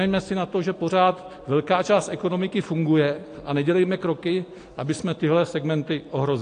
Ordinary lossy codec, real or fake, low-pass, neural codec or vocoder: AAC, 48 kbps; real; 9.9 kHz; none